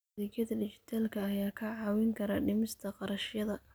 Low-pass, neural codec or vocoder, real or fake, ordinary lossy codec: none; none; real; none